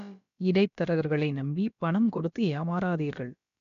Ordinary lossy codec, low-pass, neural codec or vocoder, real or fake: none; 7.2 kHz; codec, 16 kHz, about 1 kbps, DyCAST, with the encoder's durations; fake